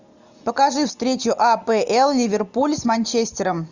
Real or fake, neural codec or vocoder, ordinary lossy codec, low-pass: real; none; Opus, 64 kbps; 7.2 kHz